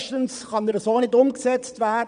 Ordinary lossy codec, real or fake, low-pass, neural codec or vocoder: none; fake; 9.9 kHz; vocoder, 22.05 kHz, 80 mel bands, Vocos